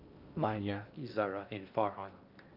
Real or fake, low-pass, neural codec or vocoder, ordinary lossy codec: fake; 5.4 kHz; codec, 16 kHz in and 24 kHz out, 0.6 kbps, FocalCodec, streaming, 2048 codes; Opus, 24 kbps